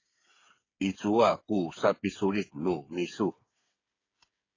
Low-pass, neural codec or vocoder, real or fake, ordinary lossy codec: 7.2 kHz; codec, 16 kHz, 8 kbps, FreqCodec, smaller model; fake; AAC, 32 kbps